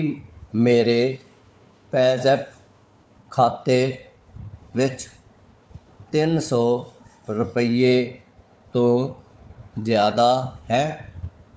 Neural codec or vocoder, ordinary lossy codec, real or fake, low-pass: codec, 16 kHz, 4 kbps, FunCodec, trained on Chinese and English, 50 frames a second; none; fake; none